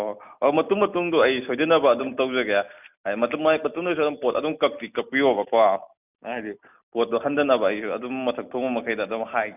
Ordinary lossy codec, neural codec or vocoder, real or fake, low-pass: none; none; real; 3.6 kHz